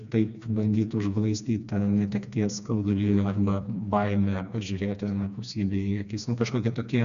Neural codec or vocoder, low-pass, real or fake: codec, 16 kHz, 2 kbps, FreqCodec, smaller model; 7.2 kHz; fake